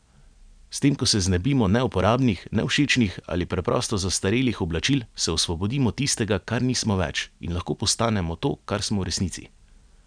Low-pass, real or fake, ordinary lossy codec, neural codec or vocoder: 9.9 kHz; real; none; none